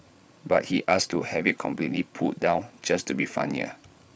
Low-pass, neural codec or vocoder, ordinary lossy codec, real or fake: none; codec, 16 kHz, 8 kbps, FreqCodec, larger model; none; fake